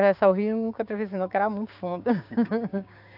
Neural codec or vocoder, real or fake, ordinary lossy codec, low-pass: codec, 16 kHz, 6 kbps, DAC; fake; AAC, 48 kbps; 5.4 kHz